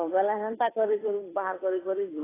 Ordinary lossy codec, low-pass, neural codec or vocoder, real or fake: AAC, 16 kbps; 3.6 kHz; codec, 16 kHz, 8 kbps, FunCodec, trained on Chinese and English, 25 frames a second; fake